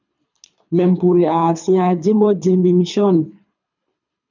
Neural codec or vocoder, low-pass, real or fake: codec, 24 kHz, 3 kbps, HILCodec; 7.2 kHz; fake